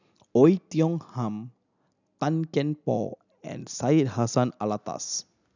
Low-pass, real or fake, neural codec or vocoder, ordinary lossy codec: 7.2 kHz; real; none; none